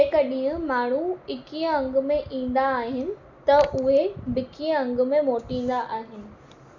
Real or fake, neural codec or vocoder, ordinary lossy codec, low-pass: real; none; none; 7.2 kHz